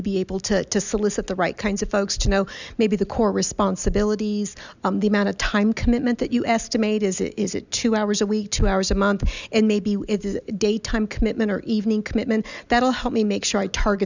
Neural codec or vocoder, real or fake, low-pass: none; real; 7.2 kHz